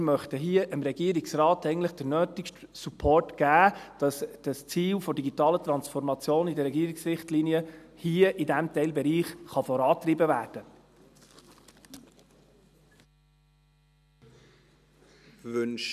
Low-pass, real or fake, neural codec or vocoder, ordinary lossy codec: 14.4 kHz; real; none; none